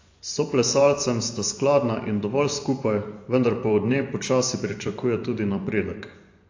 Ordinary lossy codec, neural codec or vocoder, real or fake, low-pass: AAC, 48 kbps; vocoder, 24 kHz, 100 mel bands, Vocos; fake; 7.2 kHz